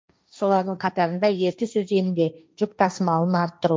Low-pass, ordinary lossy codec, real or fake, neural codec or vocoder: none; none; fake; codec, 16 kHz, 1.1 kbps, Voila-Tokenizer